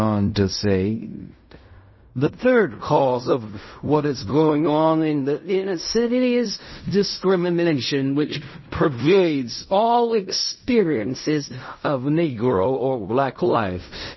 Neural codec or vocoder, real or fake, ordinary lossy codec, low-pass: codec, 16 kHz in and 24 kHz out, 0.4 kbps, LongCat-Audio-Codec, fine tuned four codebook decoder; fake; MP3, 24 kbps; 7.2 kHz